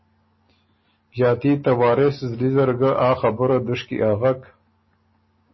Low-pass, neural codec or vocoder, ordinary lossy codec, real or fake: 7.2 kHz; none; MP3, 24 kbps; real